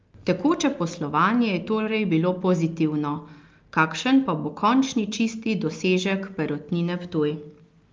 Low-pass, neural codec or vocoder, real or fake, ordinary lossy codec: 7.2 kHz; none; real; Opus, 24 kbps